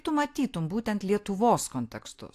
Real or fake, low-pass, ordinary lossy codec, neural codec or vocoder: real; 14.4 kHz; AAC, 64 kbps; none